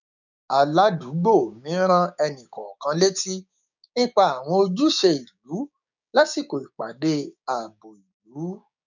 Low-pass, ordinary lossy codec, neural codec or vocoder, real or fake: 7.2 kHz; none; codec, 16 kHz, 6 kbps, DAC; fake